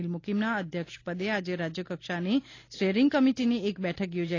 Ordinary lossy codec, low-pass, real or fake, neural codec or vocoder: AAC, 32 kbps; 7.2 kHz; real; none